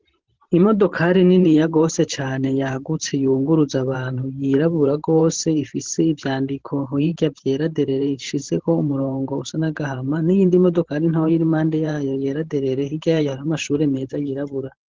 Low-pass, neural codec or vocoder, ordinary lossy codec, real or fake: 7.2 kHz; vocoder, 44.1 kHz, 128 mel bands every 512 samples, BigVGAN v2; Opus, 16 kbps; fake